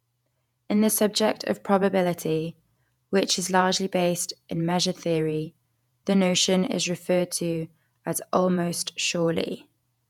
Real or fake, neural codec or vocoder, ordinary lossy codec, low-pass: fake; vocoder, 48 kHz, 128 mel bands, Vocos; none; 19.8 kHz